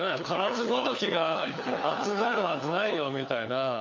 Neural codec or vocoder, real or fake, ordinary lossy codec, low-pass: codec, 16 kHz, 4 kbps, FunCodec, trained on LibriTTS, 50 frames a second; fake; MP3, 48 kbps; 7.2 kHz